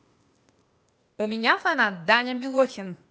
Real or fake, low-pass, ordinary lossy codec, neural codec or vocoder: fake; none; none; codec, 16 kHz, 0.8 kbps, ZipCodec